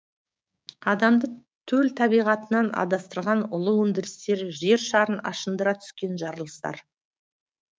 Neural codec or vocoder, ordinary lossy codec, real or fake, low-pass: codec, 16 kHz, 6 kbps, DAC; none; fake; none